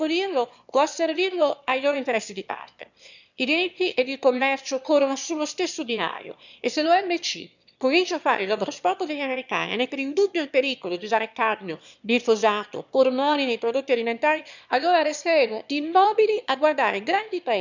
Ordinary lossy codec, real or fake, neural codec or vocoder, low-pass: none; fake; autoencoder, 22.05 kHz, a latent of 192 numbers a frame, VITS, trained on one speaker; 7.2 kHz